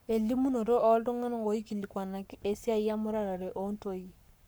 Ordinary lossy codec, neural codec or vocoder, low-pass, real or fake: none; codec, 44.1 kHz, 7.8 kbps, Pupu-Codec; none; fake